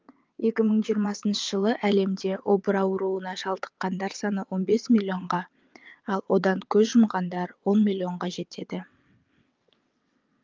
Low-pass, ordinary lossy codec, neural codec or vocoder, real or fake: 7.2 kHz; Opus, 24 kbps; vocoder, 22.05 kHz, 80 mel bands, WaveNeXt; fake